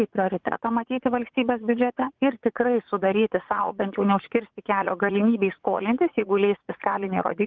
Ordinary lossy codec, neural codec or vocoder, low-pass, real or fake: Opus, 32 kbps; vocoder, 44.1 kHz, 80 mel bands, Vocos; 7.2 kHz; fake